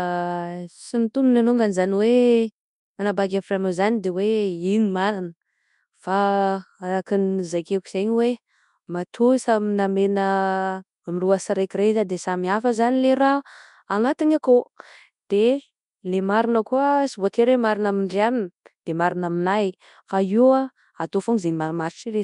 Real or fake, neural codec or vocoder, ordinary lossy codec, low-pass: fake; codec, 24 kHz, 0.9 kbps, WavTokenizer, large speech release; none; 10.8 kHz